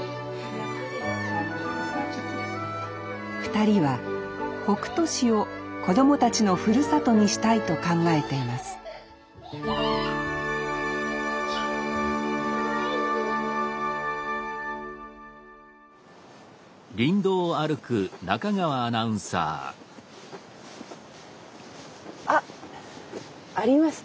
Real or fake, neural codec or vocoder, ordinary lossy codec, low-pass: real; none; none; none